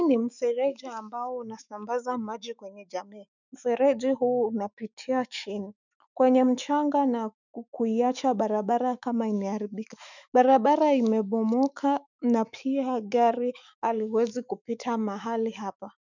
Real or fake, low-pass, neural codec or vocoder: fake; 7.2 kHz; autoencoder, 48 kHz, 128 numbers a frame, DAC-VAE, trained on Japanese speech